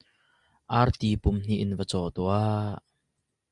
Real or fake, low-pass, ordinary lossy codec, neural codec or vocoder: real; 10.8 kHz; Opus, 64 kbps; none